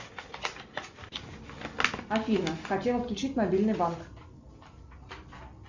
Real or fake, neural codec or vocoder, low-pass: real; none; 7.2 kHz